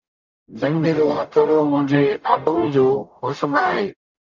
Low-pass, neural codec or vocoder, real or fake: 7.2 kHz; codec, 44.1 kHz, 0.9 kbps, DAC; fake